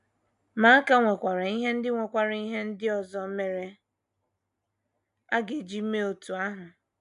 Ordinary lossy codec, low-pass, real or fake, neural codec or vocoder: none; 10.8 kHz; real; none